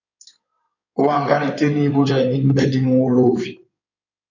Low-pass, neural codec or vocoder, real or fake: 7.2 kHz; codec, 16 kHz in and 24 kHz out, 2.2 kbps, FireRedTTS-2 codec; fake